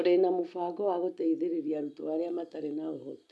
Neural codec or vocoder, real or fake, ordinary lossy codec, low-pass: none; real; none; none